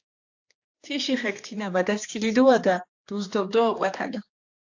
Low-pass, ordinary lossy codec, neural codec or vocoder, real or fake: 7.2 kHz; AAC, 48 kbps; codec, 16 kHz, 2 kbps, X-Codec, HuBERT features, trained on general audio; fake